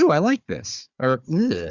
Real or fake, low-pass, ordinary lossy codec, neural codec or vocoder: fake; 7.2 kHz; Opus, 64 kbps; codec, 16 kHz, 4 kbps, FunCodec, trained on Chinese and English, 50 frames a second